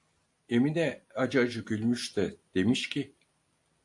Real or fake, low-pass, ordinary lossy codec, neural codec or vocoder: real; 10.8 kHz; AAC, 64 kbps; none